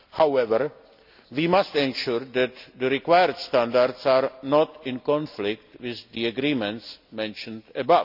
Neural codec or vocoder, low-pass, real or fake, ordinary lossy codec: none; 5.4 kHz; real; none